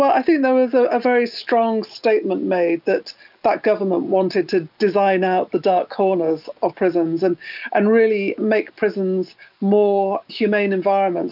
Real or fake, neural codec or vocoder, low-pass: real; none; 5.4 kHz